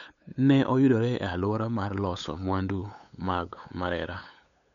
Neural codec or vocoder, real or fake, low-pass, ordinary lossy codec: codec, 16 kHz, 8 kbps, FunCodec, trained on LibriTTS, 25 frames a second; fake; 7.2 kHz; none